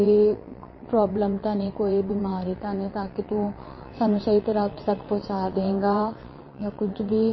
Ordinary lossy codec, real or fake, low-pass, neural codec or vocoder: MP3, 24 kbps; fake; 7.2 kHz; vocoder, 22.05 kHz, 80 mel bands, WaveNeXt